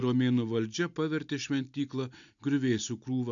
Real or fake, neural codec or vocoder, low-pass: real; none; 7.2 kHz